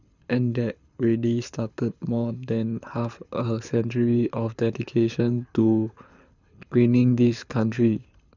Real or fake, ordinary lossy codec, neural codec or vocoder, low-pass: fake; none; codec, 24 kHz, 6 kbps, HILCodec; 7.2 kHz